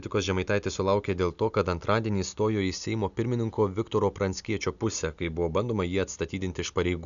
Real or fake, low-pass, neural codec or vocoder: real; 7.2 kHz; none